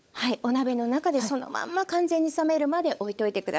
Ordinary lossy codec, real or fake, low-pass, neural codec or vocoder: none; fake; none; codec, 16 kHz, 16 kbps, FunCodec, trained on LibriTTS, 50 frames a second